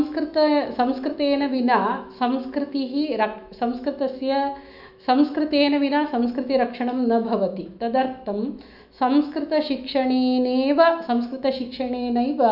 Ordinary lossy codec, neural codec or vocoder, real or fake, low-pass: none; autoencoder, 48 kHz, 128 numbers a frame, DAC-VAE, trained on Japanese speech; fake; 5.4 kHz